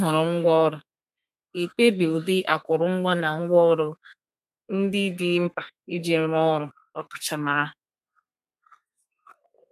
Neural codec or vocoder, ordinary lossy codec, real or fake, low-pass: codec, 32 kHz, 1.9 kbps, SNAC; none; fake; 14.4 kHz